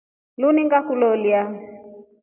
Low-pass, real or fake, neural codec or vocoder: 3.6 kHz; real; none